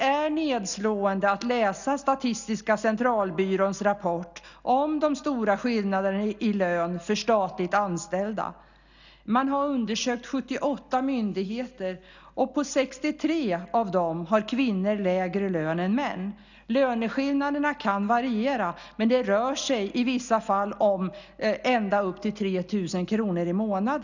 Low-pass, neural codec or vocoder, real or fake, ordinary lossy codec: 7.2 kHz; none; real; none